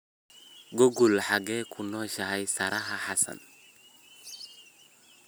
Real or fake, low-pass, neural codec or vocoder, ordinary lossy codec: real; none; none; none